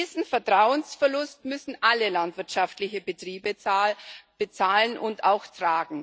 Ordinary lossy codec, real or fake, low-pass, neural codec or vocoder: none; real; none; none